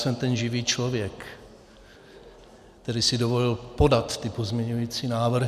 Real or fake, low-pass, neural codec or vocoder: real; 14.4 kHz; none